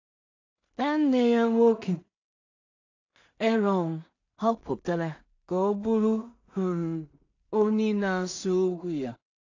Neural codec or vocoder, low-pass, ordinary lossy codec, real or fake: codec, 16 kHz in and 24 kHz out, 0.4 kbps, LongCat-Audio-Codec, two codebook decoder; 7.2 kHz; AAC, 48 kbps; fake